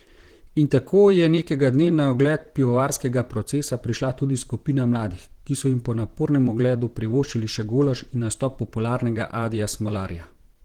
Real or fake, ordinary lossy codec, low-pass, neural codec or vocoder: fake; Opus, 16 kbps; 19.8 kHz; vocoder, 44.1 kHz, 128 mel bands, Pupu-Vocoder